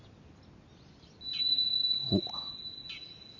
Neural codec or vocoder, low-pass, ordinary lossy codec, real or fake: none; 7.2 kHz; none; real